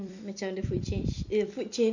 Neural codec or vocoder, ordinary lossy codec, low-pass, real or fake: vocoder, 44.1 kHz, 128 mel bands every 256 samples, BigVGAN v2; none; 7.2 kHz; fake